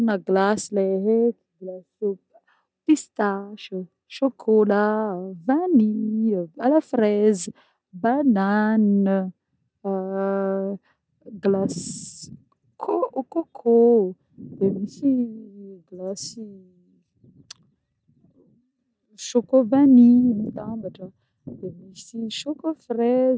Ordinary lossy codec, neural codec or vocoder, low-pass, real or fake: none; none; none; real